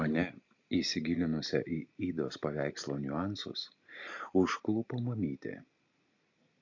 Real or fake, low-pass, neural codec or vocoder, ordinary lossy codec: real; 7.2 kHz; none; AAC, 48 kbps